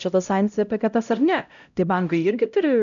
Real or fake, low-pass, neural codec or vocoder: fake; 7.2 kHz; codec, 16 kHz, 0.5 kbps, X-Codec, HuBERT features, trained on LibriSpeech